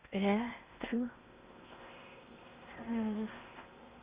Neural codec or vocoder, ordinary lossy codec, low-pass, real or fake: codec, 16 kHz in and 24 kHz out, 0.6 kbps, FocalCodec, streaming, 2048 codes; Opus, 64 kbps; 3.6 kHz; fake